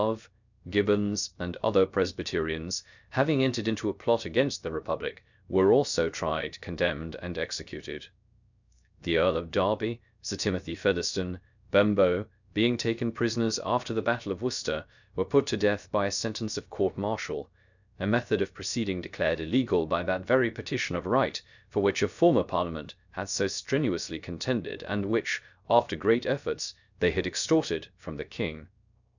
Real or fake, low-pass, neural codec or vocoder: fake; 7.2 kHz; codec, 16 kHz, 0.3 kbps, FocalCodec